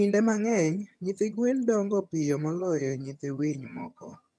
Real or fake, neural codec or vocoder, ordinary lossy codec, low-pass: fake; vocoder, 22.05 kHz, 80 mel bands, HiFi-GAN; none; none